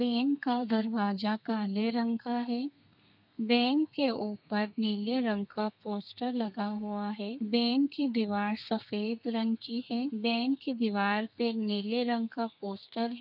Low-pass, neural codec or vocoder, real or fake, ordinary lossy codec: 5.4 kHz; codec, 32 kHz, 1.9 kbps, SNAC; fake; none